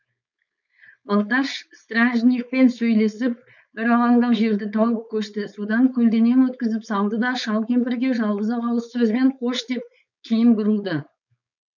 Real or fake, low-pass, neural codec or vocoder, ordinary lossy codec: fake; 7.2 kHz; codec, 16 kHz, 4.8 kbps, FACodec; none